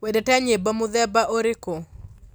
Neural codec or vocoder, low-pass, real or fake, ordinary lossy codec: none; none; real; none